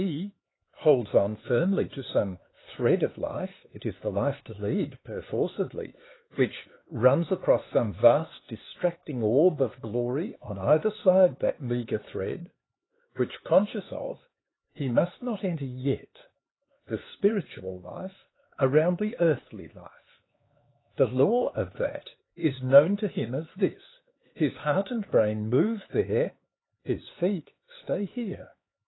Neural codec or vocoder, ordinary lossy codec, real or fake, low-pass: codec, 16 kHz, 4 kbps, X-Codec, HuBERT features, trained on LibriSpeech; AAC, 16 kbps; fake; 7.2 kHz